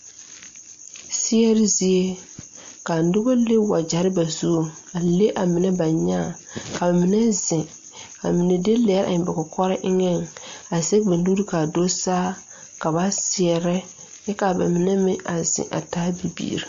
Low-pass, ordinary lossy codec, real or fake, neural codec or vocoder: 7.2 kHz; MP3, 48 kbps; real; none